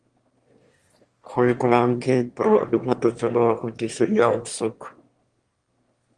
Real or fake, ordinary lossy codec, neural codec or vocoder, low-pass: fake; Opus, 24 kbps; autoencoder, 22.05 kHz, a latent of 192 numbers a frame, VITS, trained on one speaker; 9.9 kHz